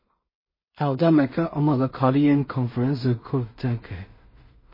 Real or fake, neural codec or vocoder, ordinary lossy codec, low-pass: fake; codec, 16 kHz in and 24 kHz out, 0.4 kbps, LongCat-Audio-Codec, two codebook decoder; MP3, 24 kbps; 5.4 kHz